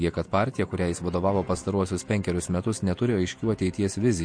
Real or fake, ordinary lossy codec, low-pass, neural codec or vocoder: fake; MP3, 48 kbps; 9.9 kHz; vocoder, 48 kHz, 128 mel bands, Vocos